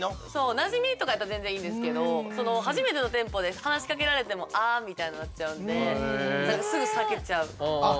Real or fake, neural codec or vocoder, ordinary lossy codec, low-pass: real; none; none; none